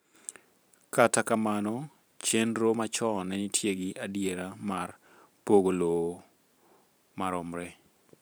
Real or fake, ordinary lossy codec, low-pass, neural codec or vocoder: fake; none; none; vocoder, 44.1 kHz, 128 mel bands every 512 samples, BigVGAN v2